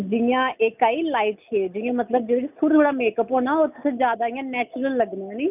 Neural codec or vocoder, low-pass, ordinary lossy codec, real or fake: none; 3.6 kHz; none; real